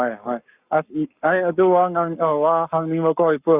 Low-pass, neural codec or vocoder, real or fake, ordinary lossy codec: 3.6 kHz; codec, 44.1 kHz, 7.8 kbps, Pupu-Codec; fake; Opus, 64 kbps